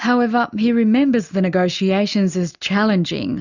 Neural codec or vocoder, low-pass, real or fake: none; 7.2 kHz; real